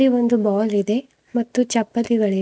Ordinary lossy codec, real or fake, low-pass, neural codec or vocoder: none; real; none; none